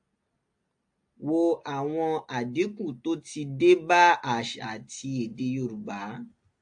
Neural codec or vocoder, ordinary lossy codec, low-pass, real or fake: none; AAC, 64 kbps; 9.9 kHz; real